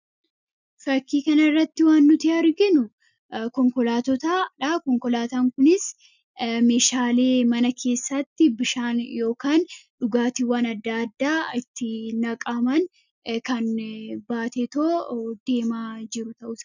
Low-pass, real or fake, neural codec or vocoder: 7.2 kHz; real; none